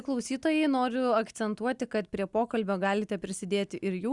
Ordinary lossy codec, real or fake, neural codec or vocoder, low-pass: Opus, 64 kbps; real; none; 10.8 kHz